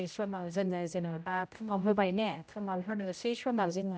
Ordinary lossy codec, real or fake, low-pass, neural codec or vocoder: none; fake; none; codec, 16 kHz, 0.5 kbps, X-Codec, HuBERT features, trained on general audio